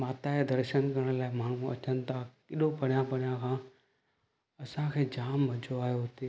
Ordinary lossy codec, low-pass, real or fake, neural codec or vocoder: none; none; real; none